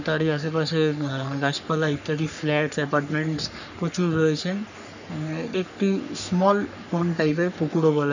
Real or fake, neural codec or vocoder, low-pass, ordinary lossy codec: fake; codec, 44.1 kHz, 3.4 kbps, Pupu-Codec; 7.2 kHz; none